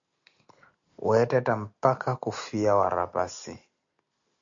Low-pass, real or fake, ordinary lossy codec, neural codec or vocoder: 7.2 kHz; real; MP3, 64 kbps; none